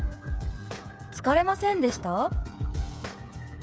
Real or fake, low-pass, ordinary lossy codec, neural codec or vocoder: fake; none; none; codec, 16 kHz, 8 kbps, FreqCodec, smaller model